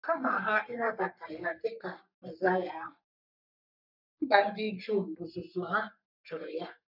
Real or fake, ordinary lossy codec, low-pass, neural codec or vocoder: fake; none; 5.4 kHz; codec, 44.1 kHz, 3.4 kbps, Pupu-Codec